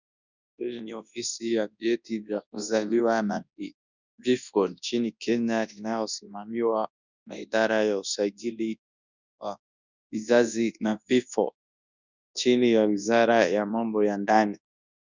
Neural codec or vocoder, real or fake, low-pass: codec, 24 kHz, 0.9 kbps, WavTokenizer, large speech release; fake; 7.2 kHz